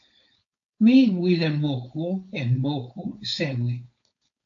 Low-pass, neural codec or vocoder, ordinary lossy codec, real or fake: 7.2 kHz; codec, 16 kHz, 4.8 kbps, FACodec; AAC, 48 kbps; fake